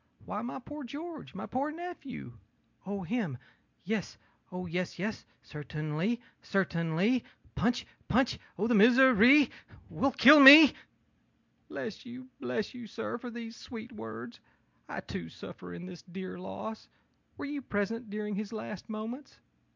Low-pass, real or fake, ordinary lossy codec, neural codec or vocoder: 7.2 kHz; real; MP3, 64 kbps; none